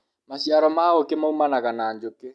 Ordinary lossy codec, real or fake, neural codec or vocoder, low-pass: none; real; none; none